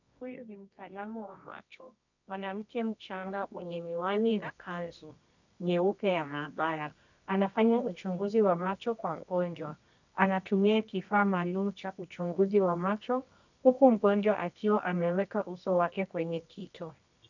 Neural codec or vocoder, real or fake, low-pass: codec, 24 kHz, 0.9 kbps, WavTokenizer, medium music audio release; fake; 7.2 kHz